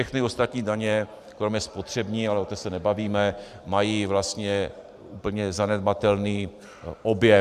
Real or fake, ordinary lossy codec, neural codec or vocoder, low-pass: fake; AAC, 96 kbps; vocoder, 44.1 kHz, 128 mel bands every 512 samples, BigVGAN v2; 14.4 kHz